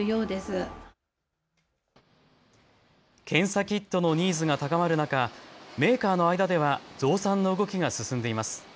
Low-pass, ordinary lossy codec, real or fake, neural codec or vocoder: none; none; real; none